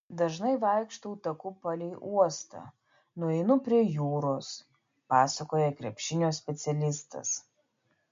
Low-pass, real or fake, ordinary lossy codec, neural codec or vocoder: 7.2 kHz; real; MP3, 48 kbps; none